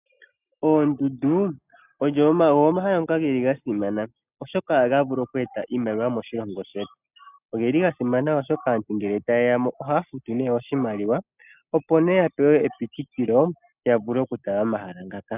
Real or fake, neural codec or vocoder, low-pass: real; none; 3.6 kHz